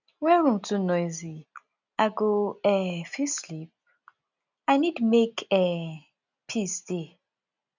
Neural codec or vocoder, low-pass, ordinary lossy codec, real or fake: none; 7.2 kHz; none; real